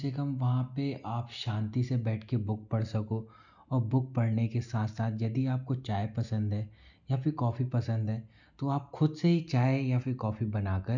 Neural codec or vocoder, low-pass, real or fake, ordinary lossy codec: none; 7.2 kHz; real; none